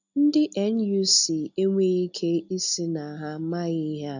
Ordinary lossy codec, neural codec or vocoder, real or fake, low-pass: none; none; real; 7.2 kHz